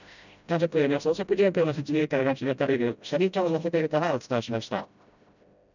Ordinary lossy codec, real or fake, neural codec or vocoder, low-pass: none; fake; codec, 16 kHz, 0.5 kbps, FreqCodec, smaller model; 7.2 kHz